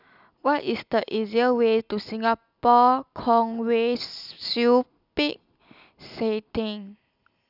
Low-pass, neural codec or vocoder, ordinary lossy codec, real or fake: 5.4 kHz; none; none; real